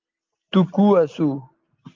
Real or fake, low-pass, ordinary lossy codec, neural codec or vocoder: real; 7.2 kHz; Opus, 32 kbps; none